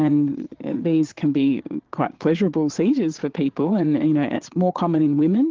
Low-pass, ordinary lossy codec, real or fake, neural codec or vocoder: 7.2 kHz; Opus, 16 kbps; fake; vocoder, 22.05 kHz, 80 mel bands, Vocos